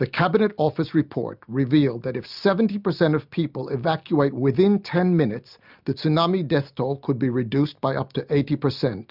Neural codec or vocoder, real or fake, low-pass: none; real; 5.4 kHz